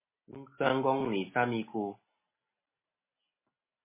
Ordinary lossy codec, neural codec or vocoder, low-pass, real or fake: MP3, 16 kbps; none; 3.6 kHz; real